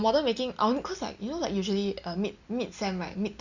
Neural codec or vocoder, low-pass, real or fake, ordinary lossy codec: none; 7.2 kHz; real; Opus, 64 kbps